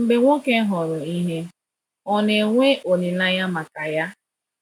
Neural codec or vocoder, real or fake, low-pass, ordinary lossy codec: none; real; 19.8 kHz; none